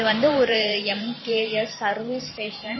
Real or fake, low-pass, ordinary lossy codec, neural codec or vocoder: fake; 7.2 kHz; MP3, 24 kbps; vocoder, 44.1 kHz, 128 mel bands every 512 samples, BigVGAN v2